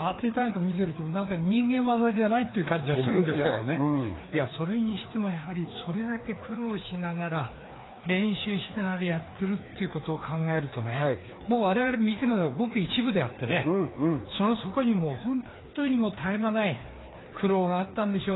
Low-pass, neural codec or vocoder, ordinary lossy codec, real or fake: 7.2 kHz; codec, 16 kHz, 2 kbps, FreqCodec, larger model; AAC, 16 kbps; fake